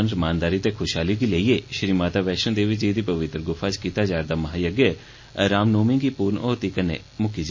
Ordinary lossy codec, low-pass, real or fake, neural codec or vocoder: MP3, 32 kbps; 7.2 kHz; real; none